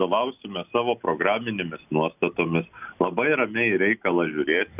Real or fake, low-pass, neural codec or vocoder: real; 3.6 kHz; none